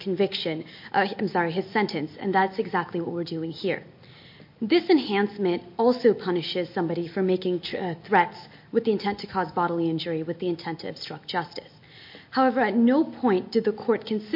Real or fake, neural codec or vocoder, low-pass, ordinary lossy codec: real; none; 5.4 kHz; MP3, 32 kbps